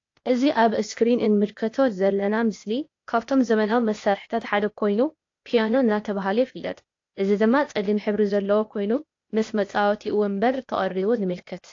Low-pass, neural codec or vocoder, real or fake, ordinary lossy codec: 7.2 kHz; codec, 16 kHz, 0.8 kbps, ZipCodec; fake; AAC, 48 kbps